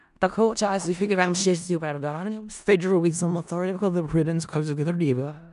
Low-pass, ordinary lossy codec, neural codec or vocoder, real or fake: 10.8 kHz; none; codec, 16 kHz in and 24 kHz out, 0.4 kbps, LongCat-Audio-Codec, four codebook decoder; fake